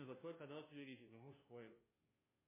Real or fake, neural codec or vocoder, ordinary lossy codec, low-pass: fake; codec, 16 kHz, 0.5 kbps, FunCodec, trained on Chinese and English, 25 frames a second; MP3, 16 kbps; 3.6 kHz